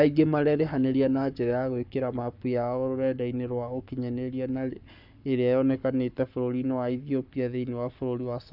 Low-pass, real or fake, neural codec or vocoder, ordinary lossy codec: 5.4 kHz; fake; codec, 44.1 kHz, 7.8 kbps, Pupu-Codec; none